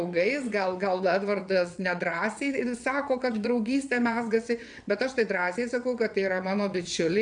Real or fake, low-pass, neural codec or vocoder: fake; 9.9 kHz; vocoder, 22.05 kHz, 80 mel bands, WaveNeXt